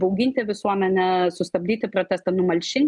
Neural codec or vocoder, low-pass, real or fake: none; 10.8 kHz; real